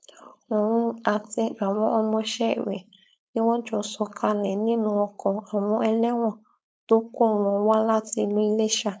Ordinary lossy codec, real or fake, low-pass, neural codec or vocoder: none; fake; none; codec, 16 kHz, 4.8 kbps, FACodec